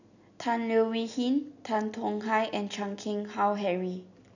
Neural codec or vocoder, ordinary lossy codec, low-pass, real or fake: none; none; 7.2 kHz; real